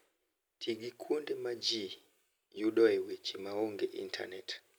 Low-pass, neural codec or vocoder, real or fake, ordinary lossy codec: none; none; real; none